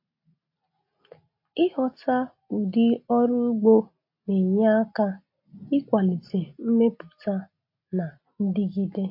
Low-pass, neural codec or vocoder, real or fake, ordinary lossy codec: 5.4 kHz; none; real; MP3, 32 kbps